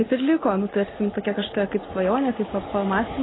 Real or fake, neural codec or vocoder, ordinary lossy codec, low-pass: real; none; AAC, 16 kbps; 7.2 kHz